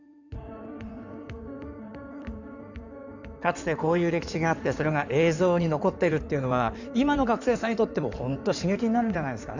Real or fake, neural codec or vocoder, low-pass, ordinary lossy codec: fake; codec, 16 kHz in and 24 kHz out, 2.2 kbps, FireRedTTS-2 codec; 7.2 kHz; none